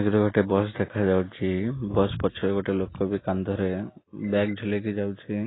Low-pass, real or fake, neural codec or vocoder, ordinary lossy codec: 7.2 kHz; real; none; AAC, 16 kbps